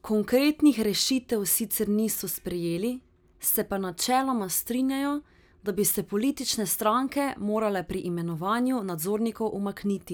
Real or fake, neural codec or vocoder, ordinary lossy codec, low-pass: real; none; none; none